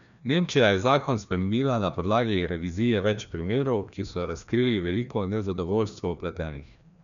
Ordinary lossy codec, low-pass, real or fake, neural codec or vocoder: MP3, 96 kbps; 7.2 kHz; fake; codec, 16 kHz, 1 kbps, FreqCodec, larger model